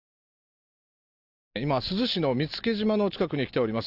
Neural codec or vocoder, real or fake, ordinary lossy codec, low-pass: none; real; AAC, 48 kbps; 5.4 kHz